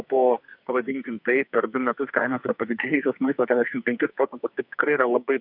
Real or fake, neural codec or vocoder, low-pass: fake; codec, 44.1 kHz, 2.6 kbps, SNAC; 5.4 kHz